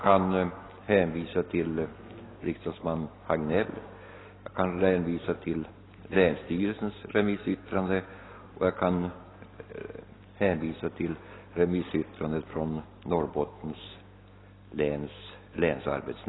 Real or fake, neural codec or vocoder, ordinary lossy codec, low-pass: real; none; AAC, 16 kbps; 7.2 kHz